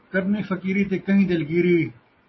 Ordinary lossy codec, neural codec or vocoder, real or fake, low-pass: MP3, 24 kbps; none; real; 7.2 kHz